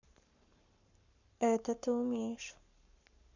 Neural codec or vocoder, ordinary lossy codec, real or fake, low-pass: codec, 44.1 kHz, 7.8 kbps, Pupu-Codec; none; fake; 7.2 kHz